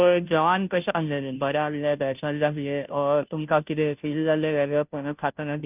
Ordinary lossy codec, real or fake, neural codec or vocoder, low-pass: none; fake; codec, 16 kHz, 0.5 kbps, FunCodec, trained on Chinese and English, 25 frames a second; 3.6 kHz